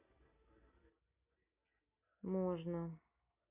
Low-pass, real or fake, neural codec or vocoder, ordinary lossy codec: 3.6 kHz; real; none; none